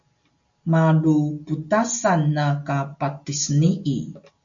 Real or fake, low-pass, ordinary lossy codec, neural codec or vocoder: real; 7.2 kHz; MP3, 64 kbps; none